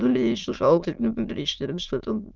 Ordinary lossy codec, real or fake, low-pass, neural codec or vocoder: Opus, 32 kbps; fake; 7.2 kHz; autoencoder, 22.05 kHz, a latent of 192 numbers a frame, VITS, trained on many speakers